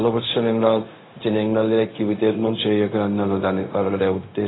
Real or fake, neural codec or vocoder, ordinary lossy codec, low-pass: fake; codec, 16 kHz, 0.4 kbps, LongCat-Audio-Codec; AAC, 16 kbps; 7.2 kHz